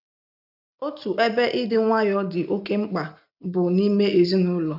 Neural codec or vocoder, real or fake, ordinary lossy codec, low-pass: none; real; none; 5.4 kHz